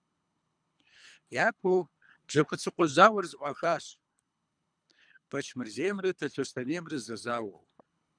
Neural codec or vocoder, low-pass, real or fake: codec, 24 kHz, 3 kbps, HILCodec; 9.9 kHz; fake